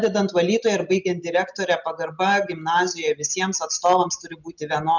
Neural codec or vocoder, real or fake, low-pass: none; real; 7.2 kHz